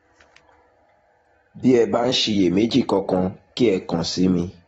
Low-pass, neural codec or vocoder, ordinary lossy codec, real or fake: 19.8 kHz; vocoder, 44.1 kHz, 128 mel bands every 256 samples, BigVGAN v2; AAC, 24 kbps; fake